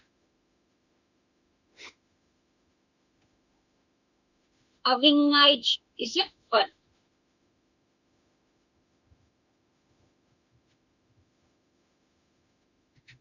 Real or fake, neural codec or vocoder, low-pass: fake; autoencoder, 48 kHz, 32 numbers a frame, DAC-VAE, trained on Japanese speech; 7.2 kHz